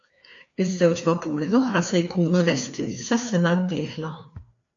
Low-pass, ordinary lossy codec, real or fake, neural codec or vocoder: 7.2 kHz; MP3, 64 kbps; fake; codec, 16 kHz, 2 kbps, FreqCodec, larger model